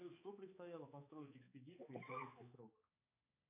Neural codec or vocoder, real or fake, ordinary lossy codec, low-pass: codec, 16 kHz, 4 kbps, X-Codec, HuBERT features, trained on balanced general audio; fake; AAC, 24 kbps; 3.6 kHz